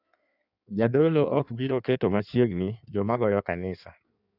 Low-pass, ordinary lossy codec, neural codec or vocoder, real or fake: 5.4 kHz; none; codec, 16 kHz in and 24 kHz out, 1.1 kbps, FireRedTTS-2 codec; fake